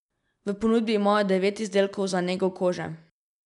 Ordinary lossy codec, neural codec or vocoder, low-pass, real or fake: none; none; 10.8 kHz; real